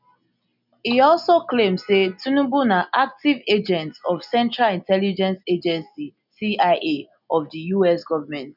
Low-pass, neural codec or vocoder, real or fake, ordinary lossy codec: 5.4 kHz; none; real; none